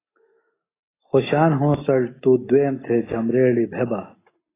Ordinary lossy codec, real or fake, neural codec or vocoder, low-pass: AAC, 16 kbps; real; none; 3.6 kHz